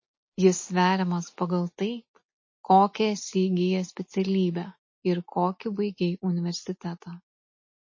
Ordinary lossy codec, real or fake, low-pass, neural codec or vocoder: MP3, 32 kbps; real; 7.2 kHz; none